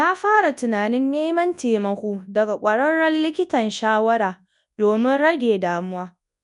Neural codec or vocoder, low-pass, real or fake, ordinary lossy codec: codec, 24 kHz, 0.9 kbps, WavTokenizer, large speech release; 10.8 kHz; fake; none